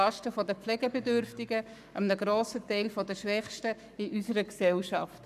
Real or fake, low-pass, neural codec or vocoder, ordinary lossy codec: fake; 14.4 kHz; codec, 44.1 kHz, 7.8 kbps, Pupu-Codec; none